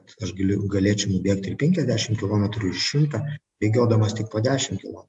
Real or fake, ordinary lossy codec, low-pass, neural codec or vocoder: real; AAC, 96 kbps; 10.8 kHz; none